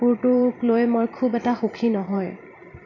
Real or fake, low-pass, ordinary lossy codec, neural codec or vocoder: fake; 7.2 kHz; none; vocoder, 44.1 kHz, 128 mel bands every 512 samples, BigVGAN v2